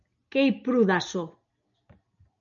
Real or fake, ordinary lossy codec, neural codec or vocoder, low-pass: real; MP3, 96 kbps; none; 7.2 kHz